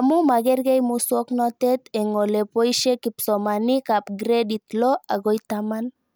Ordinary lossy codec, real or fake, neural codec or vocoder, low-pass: none; real; none; none